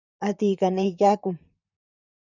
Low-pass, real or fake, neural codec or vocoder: 7.2 kHz; fake; vocoder, 44.1 kHz, 128 mel bands, Pupu-Vocoder